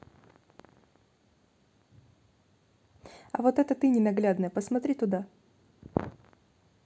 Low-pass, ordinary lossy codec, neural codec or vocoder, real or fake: none; none; none; real